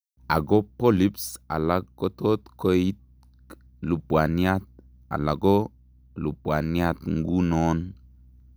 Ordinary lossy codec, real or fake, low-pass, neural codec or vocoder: none; real; none; none